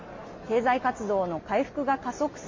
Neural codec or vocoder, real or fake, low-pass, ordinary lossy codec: none; real; 7.2 kHz; MP3, 32 kbps